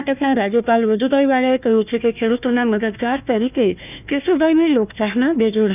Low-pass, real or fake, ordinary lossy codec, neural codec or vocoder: 3.6 kHz; fake; none; codec, 16 kHz, 1 kbps, FunCodec, trained on Chinese and English, 50 frames a second